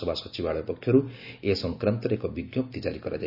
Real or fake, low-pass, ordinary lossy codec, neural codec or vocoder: real; 5.4 kHz; none; none